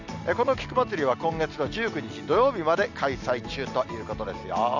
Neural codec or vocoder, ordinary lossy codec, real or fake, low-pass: none; none; real; 7.2 kHz